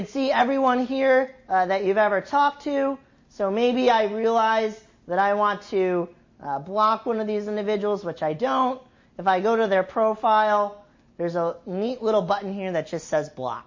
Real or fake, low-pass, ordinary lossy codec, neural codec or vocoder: real; 7.2 kHz; MP3, 32 kbps; none